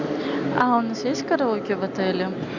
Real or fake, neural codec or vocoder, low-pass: real; none; 7.2 kHz